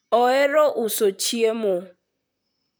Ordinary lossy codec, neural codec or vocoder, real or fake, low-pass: none; vocoder, 44.1 kHz, 128 mel bands, Pupu-Vocoder; fake; none